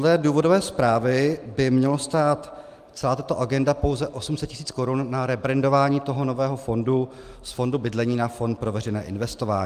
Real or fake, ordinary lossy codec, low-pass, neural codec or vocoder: real; Opus, 32 kbps; 14.4 kHz; none